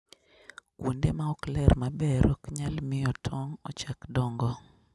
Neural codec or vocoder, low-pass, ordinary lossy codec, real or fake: none; none; none; real